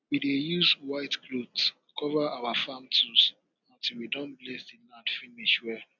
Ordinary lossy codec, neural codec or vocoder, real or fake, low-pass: none; none; real; 7.2 kHz